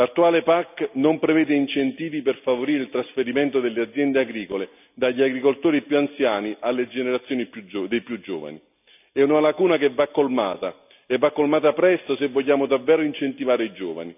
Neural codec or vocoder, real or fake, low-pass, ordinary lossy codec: none; real; 3.6 kHz; none